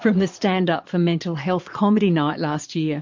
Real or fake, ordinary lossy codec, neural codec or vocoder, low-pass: fake; MP3, 48 kbps; vocoder, 22.05 kHz, 80 mel bands, Vocos; 7.2 kHz